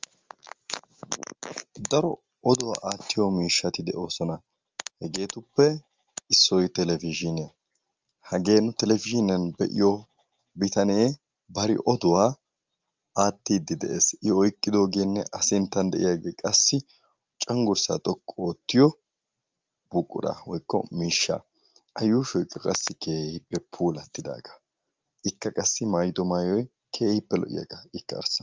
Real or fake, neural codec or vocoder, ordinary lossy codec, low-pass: real; none; Opus, 32 kbps; 7.2 kHz